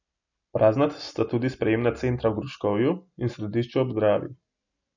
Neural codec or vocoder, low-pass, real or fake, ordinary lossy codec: none; 7.2 kHz; real; none